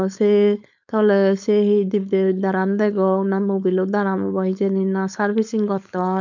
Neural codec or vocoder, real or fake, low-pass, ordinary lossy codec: codec, 16 kHz, 4.8 kbps, FACodec; fake; 7.2 kHz; none